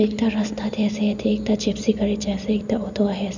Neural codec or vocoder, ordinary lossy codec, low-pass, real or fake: none; none; 7.2 kHz; real